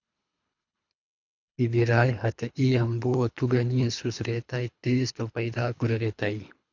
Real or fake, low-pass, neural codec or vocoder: fake; 7.2 kHz; codec, 24 kHz, 3 kbps, HILCodec